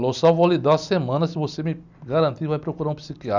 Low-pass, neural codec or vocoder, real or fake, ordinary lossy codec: 7.2 kHz; none; real; none